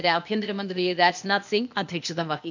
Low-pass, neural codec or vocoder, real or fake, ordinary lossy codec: 7.2 kHz; codec, 16 kHz, 0.8 kbps, ZipCodec; fake; none